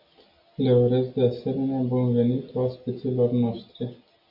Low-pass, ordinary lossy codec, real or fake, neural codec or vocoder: 5.4 kHz; MP3, 32 kbps; real; none